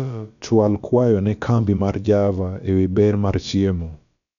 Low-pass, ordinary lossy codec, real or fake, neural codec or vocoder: 7.2 kHz; none; fake; codec, 16 kHz, about 1 kbps, DyCAST, with the encoder's durations